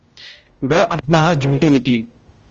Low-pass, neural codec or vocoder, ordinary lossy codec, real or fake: 7.2 kHz; codec, 16 kHz, 0.5 kbps, X-Codec, HuBERT features, trained on general audio; Opus, 24 kbps; fake